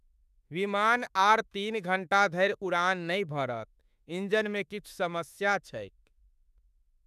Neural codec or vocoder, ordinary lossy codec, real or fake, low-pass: autoencoder, 48 kHz, 32 numbers a frame, DAC-VAE, trained on Japanese speech; none; fake; 14.4 kHz